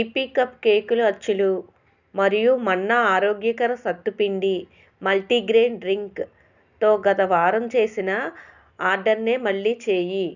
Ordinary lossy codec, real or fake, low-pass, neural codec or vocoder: none; real; 7.2 kHz; none